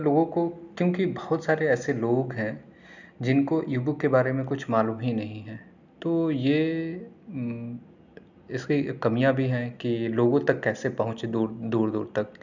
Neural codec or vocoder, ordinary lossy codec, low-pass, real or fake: none; none; 7.2 kHz; real